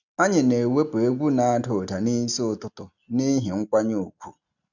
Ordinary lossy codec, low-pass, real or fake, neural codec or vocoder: none; 7.2 kHz; real; none